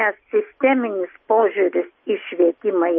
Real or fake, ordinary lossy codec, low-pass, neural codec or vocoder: real; MP3, 24 kbps; 7.2 kHz; none